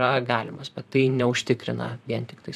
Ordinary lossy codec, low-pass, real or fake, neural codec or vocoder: AAC, 96 kbps; 14.4 kHz; fake; vocoder, 44.1 kHz, 128 mel bands, Pupu-Vocoder